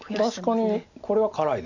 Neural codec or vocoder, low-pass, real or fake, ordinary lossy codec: none; 7.2 kHz; real; none